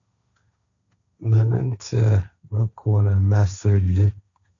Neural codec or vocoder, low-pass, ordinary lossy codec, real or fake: codec, 16 kHz, 1.1 kbps, Voila-Tokenizer; 7.2 kHz; MP3, 96 kbps; fake